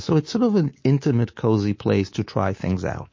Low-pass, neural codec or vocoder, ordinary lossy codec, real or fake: 7.2 kHz; codec, 24 kHz, 3.1 kbps, DualCodec; MP3, 32 kbps; fake